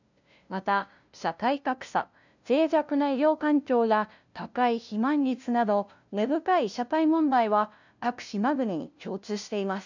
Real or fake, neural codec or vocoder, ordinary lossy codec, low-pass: fake; codec, 16 kHz, 0.5 kbps, FunCodec, trained on LibriTTS, 25 frames a second; none; 7.2 kHz